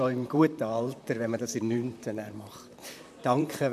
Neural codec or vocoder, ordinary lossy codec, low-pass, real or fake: vocoder, 44.1 kHz, 128 mel bands, Pupu-Vocoder; MP3, 96 kbps; 14.4 kHz; fake